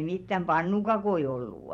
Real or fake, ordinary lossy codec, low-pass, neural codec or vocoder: fake; MP3, 64 kbps; 19.8 kHz; vocoder, 44.1 kHz, 128 mel bands every 512 samples, BigVGAN v2